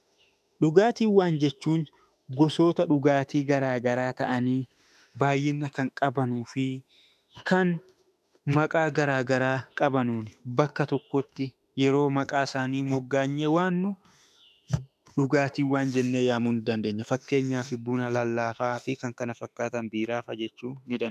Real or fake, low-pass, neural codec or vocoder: fake; 14.4 kHz; autoencoder, 48 kHz, 32 numbers a frame, DAC-VAE, trained on Japanese speech